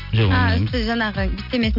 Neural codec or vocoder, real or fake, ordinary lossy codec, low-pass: none; real; none; 5.4 kHz